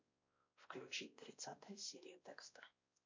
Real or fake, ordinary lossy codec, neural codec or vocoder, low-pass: fake; MP3, 48 kbps; codec, 16 kHz, 1 kbps, X-Codec, WavLM features, trained on Multilingual LibriSpeech; 7.2 kHz